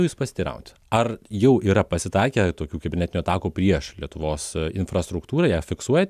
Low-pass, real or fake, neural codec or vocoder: 14.4 kHz; real; none